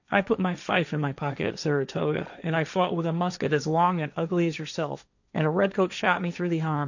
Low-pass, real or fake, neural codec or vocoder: 7.2 kHz; fake; codec, 16 kHz, 1.1 kbps, Voila-Tokenizer